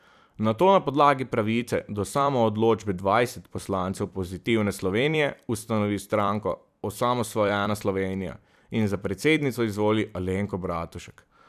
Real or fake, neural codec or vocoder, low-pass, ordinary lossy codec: fake; vocoder, 44.1 kHz, 128 mel bands every 256 samples, BigVGAN v2; 14.4 kHz; none